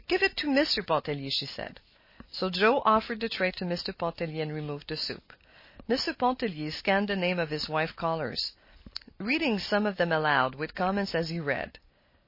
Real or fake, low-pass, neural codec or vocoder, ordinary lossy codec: real; 5.4 kHz; none; MP3, 24 kbps